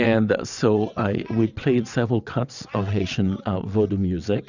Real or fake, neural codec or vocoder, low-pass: fake; vocoder, 22.05 kHz, 80 mel bands, WaveNeXt; 7.2 kHz